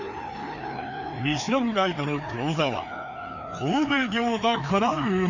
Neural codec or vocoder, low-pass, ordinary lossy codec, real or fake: codec, 16 kHz, 2 kbps, FreqCodec, larger model; 7.2 kHz; none; fake